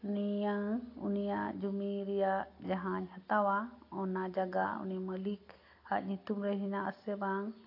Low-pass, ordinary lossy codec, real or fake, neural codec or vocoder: 5.4 kHz; AAC, 32 kbps; real; none